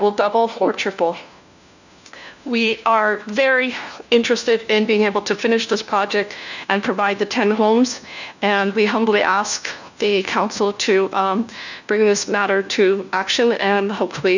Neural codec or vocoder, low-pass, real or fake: codec, 16 kHz, 1 kbps, FunCodec, trained on LibriTTS, 50 frames a second; 7.2 kHz; fake